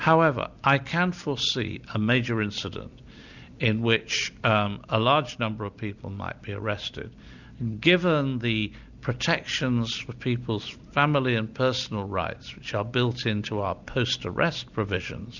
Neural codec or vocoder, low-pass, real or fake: none; 7.2 kHz; real